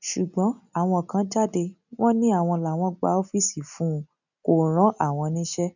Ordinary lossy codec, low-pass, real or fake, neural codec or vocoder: none; 7.2 kHz; real; none